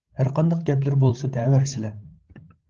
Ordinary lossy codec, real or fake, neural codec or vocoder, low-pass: Opus, 16 kbps; fake; codec, 16 kHz, 8 kbps, FreqCodec, larger model; 7.2 kHz